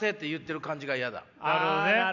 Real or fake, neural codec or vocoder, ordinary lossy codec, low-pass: real; none; none; 7.2 kHz